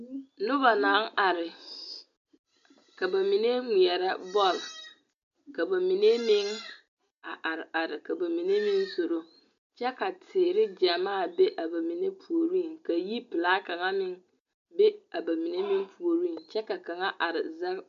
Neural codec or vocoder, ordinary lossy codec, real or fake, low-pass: none; MP3, 64 kbps; real; 7.2 kHz